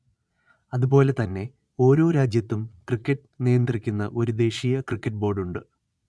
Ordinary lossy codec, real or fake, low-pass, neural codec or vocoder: none; fake; none; vocoder, 22.05 kHz, 80 mel bands, Vocos